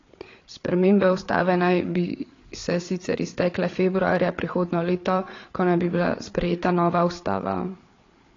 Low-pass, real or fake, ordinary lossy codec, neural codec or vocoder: 7.2 kHz; fake; AAC, 32 kbps; codec, 16 kHz, 8 kbps, FreqCodec, larger model